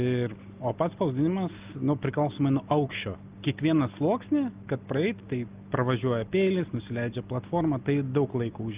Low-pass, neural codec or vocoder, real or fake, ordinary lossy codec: 3.6 kHz; none; real; Opus, 24 kbps